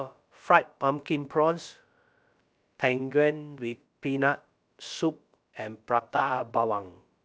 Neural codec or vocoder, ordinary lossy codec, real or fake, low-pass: codec, 16 kHz, about 1 kbps, DyCAST, with the encoder's durations; none; fake; none